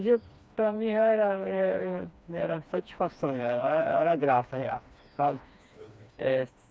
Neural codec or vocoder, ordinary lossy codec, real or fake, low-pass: codec, 16 kHz, 2 kbps, FreqCodec, smaller model; none; fake; none